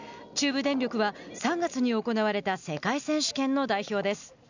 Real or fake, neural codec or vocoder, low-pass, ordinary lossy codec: real; none; 7.2 kHz; none